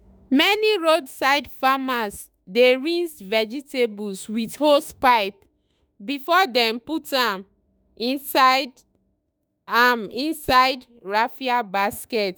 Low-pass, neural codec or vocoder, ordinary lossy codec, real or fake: none; autoencoder, 48 kHz, 32 numbers a frame, DAC-VAE, trained on Japanese speech; none; fake